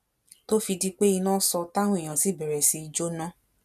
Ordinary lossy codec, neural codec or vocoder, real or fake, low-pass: none; none; real; 14.4 kHz